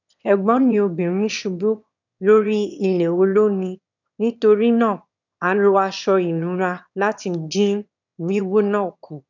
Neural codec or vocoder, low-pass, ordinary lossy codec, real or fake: autoencoder, 22.05 kHz, a latent of 192 numbers a frame, VITS, trained on one speaker; 7.2 kHz; none; fake